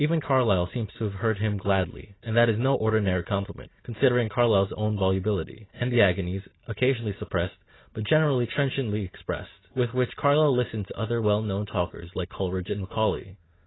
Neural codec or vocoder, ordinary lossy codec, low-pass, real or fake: none; AAC, 16 kbps; 7.2 kHz; real